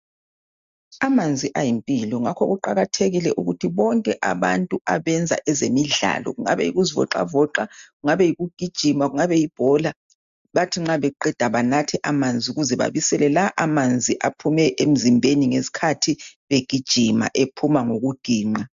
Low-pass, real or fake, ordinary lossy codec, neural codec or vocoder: 7.2 kHz; real; MP3, 64 kbps; none